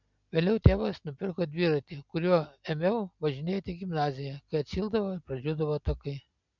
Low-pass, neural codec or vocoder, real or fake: 7.2 kHz; none; real